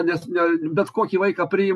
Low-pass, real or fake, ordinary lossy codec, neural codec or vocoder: 14.4 kHz; real; MP3, 64 kbps; none